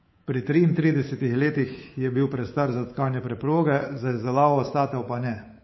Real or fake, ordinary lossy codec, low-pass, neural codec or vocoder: fake; MP3, 24 kbps; 7.2 kHz; codec, 24 kHz, 3.1 kbps, DualCodec